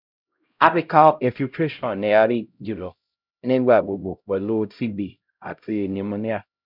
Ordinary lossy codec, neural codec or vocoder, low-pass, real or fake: none; codec, 16 kHz, 0.5 kbps, X-Codec, HuBERT features, trained on LibriSpeech; 5.4 kHz; fake